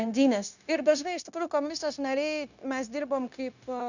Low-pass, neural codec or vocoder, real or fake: 7.2 kHz; codec, 16 kHz, 0.9 kbps, LongCat-Audio-Codec; fake